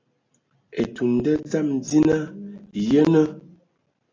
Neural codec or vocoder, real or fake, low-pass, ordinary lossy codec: none; real; 7.2 kHz; AAC, 32 kbps